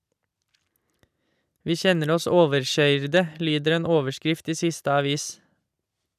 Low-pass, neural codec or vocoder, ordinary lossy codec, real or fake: 14.4 kHz; none; none; real